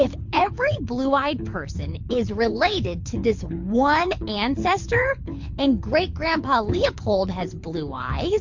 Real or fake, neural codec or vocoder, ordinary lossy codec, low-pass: fake; codec, 24 kHz, 6 kbps, HILCodec; MP3, 48 kbps; 7.2 kHz